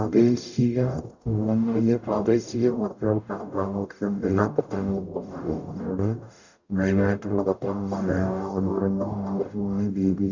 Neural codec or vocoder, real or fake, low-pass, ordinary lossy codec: codec, 44.1 kHz, 0.9 kbps, DAC; fake; 7.2 kHz; none